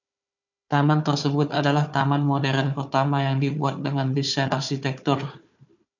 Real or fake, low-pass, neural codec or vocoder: fake; 7.2 kHz; codec, 16 kHz, 4 kbps, FunCodec, trained on Chinese and English, 50 frames a second